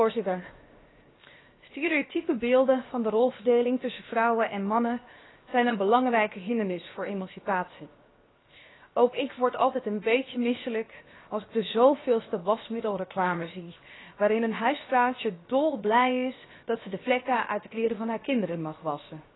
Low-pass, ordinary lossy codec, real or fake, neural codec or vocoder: 7.2 kHz; AAC, 16 kbps; fake; codec, 16 kHz, 0.7 kbps, FocalCodec